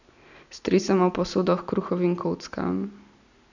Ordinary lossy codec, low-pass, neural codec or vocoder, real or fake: none; 7.2 kHz; none; real